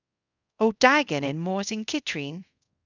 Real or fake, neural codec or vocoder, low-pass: fake; codec, 24 kHz, 0.5 kbps, DualCodec; 7.2 kHz